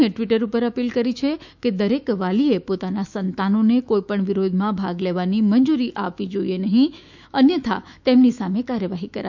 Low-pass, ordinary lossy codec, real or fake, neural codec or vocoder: 7.2 kHz; none; fake; autoencoder, 48 kHz, 128 numbers a frame, DAC-VAE, trained on Japanese speech